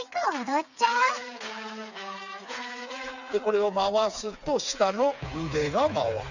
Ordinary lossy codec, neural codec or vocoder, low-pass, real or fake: none; codec, 16 kHz, 4 kbps, FreqCodec, smaller model; 7.2 kHz; fake